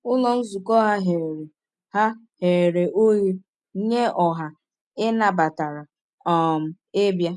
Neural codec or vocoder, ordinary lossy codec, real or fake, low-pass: none; none; real; 10.8 kHz